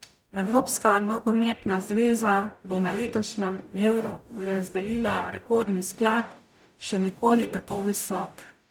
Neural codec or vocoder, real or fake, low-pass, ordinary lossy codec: codec, 44.1 kHz, 0.9 kbps, DAC; fake; 19.8 kHz; none